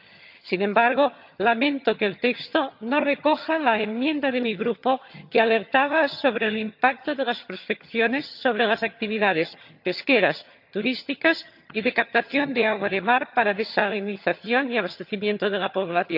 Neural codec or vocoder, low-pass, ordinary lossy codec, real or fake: vocoder, 22.05 kHz, 80 mel bands, HiFi-GAN; 5.4 kHz; none; fake